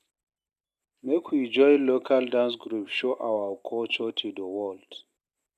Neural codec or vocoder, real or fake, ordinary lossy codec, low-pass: none; real; none; 14.4 kHz